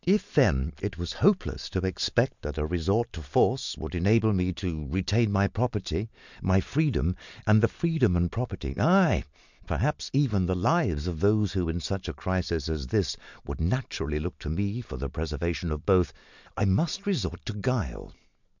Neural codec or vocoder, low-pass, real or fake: none; 7.2 kHz; real